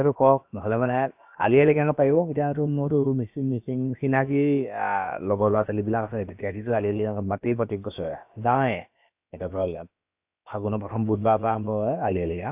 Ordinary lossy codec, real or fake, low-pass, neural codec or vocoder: AAC, 32 kbps; fake; 3.6 kHz; codec, 16 kHz, about 1 kbps, DyCAST, with the encoder's durations